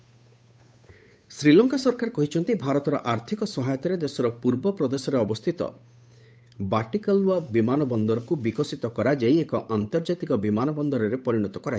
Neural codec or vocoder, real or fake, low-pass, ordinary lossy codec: codec, 16 kHz, 8 kbps, FunCodec, trained on Chinese and English, 25 frames a second; fake; none; none